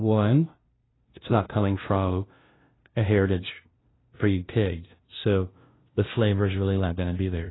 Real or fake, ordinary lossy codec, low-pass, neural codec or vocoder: fake; AAC, 16 kbps; 7.2 kHz; codec, 16 kHz, 0.5 kbps, FunCodec, trained on LibriTTS, 25 frames a second